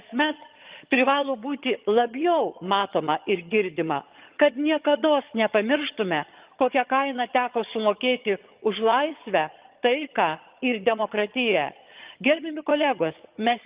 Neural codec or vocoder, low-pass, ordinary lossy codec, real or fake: vocoder, 22.05 kHz, 80 mel bands, HiFi-GAN; 3.6 kHz; Opus, 24 kbps; fake